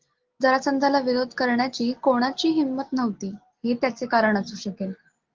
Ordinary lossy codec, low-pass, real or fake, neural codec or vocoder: Opus, 16 kbps; 7.2 kHz; real; none